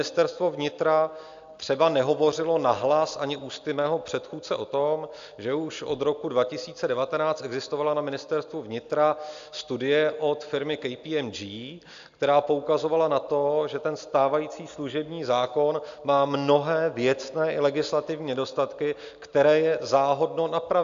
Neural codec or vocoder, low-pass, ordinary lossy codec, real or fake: none; 7.2 kHz; AAC, 64 kbps; real